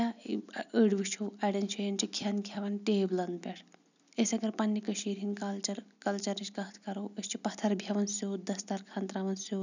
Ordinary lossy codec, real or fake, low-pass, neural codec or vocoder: none; real; 7.2 kHz; none